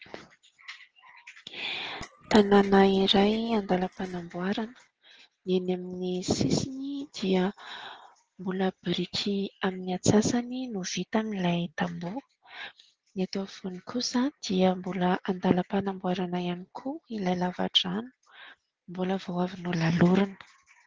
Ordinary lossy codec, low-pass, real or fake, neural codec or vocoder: Opus, 16 kbps; 7.2 kHz; real; none